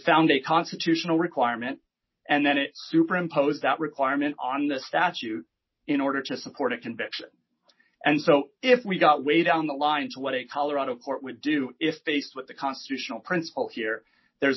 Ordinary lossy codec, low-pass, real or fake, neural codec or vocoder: MP3, 24 kbps; 7.2 kHz; real; none